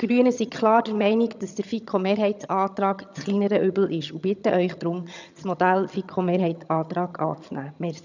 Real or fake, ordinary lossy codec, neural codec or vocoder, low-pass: fake; none; vocoder, 22.05 kHz, 80 mel bands, HiFi-GAN; 7.2 kHz